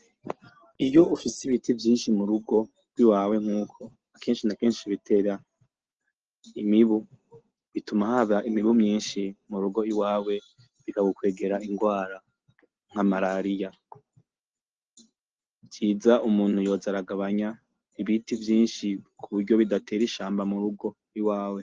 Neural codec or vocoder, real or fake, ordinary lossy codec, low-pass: none; real; Opus, 16 kbps; 7.2 kHz